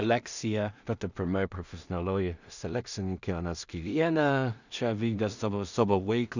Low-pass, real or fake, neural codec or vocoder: 7.2 kHz; fake; codec, 16 kHz in and 24 kHz out, 0.4 kbps, LongCat-Audio-Codec, two codebook decoder